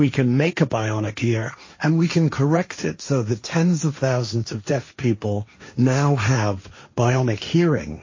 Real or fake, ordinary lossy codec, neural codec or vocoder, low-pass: fake; MP3, 32 kbps; codec, 16 kHz, 1.1 kbps, Voila-Tokenizer; 7.2 kHz